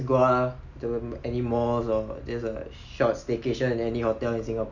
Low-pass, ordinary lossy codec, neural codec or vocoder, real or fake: 7.2 kHz; none; none; real